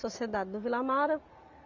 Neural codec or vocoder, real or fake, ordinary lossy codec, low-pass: none; real; none; 7.2 kHz